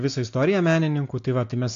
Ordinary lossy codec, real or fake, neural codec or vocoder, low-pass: AAC, 64 kbps; real; none; 7.2 kHz